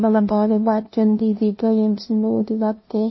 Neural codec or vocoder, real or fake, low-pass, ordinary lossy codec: codec, 16 kHz, 0.5 kbps, FunCodec, trained on LibriTTS, 25 frames a second; fake; 7.2 kHz; MP3, 24 kbps